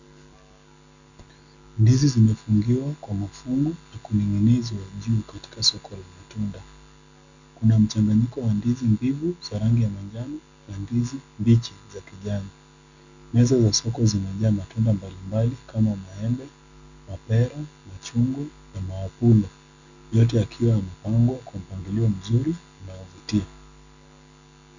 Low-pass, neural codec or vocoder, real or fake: 7.2 kHz; none; real